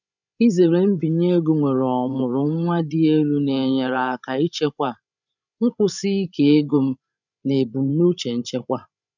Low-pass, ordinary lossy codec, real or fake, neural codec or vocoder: 7.2 kHz; none; fake; codec, 16 kHz, 16 kbps, FreqCodec, larger model